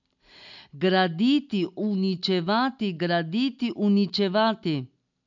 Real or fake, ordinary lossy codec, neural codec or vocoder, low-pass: real; none; none; 7.2 kHz